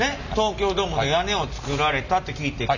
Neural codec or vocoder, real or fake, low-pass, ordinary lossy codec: none; real; 7.2 kHz; none